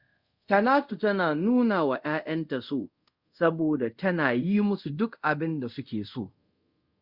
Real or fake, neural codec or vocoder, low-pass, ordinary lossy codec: fake; codec, 24 kHz, 0.5 kbps, DualCodec; 5.4 kHz; Opus, 64 kbps